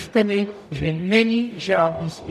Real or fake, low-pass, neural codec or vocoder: fake; 14.4 kHz; codec, 44.1 kHz, 0.9 kbps, DAC